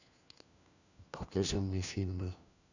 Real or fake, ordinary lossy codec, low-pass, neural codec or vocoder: fake; none; 7.2 kHz; codec, 16 kHz, 1 kbps, FunCodec, trained on LibriTTS, 50 frames a second